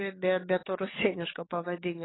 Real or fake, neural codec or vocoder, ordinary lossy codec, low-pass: fake; codec, 44.1 kHz, 7.8 kbps, DAC; AAC, 16 kbps; 7.2 kHz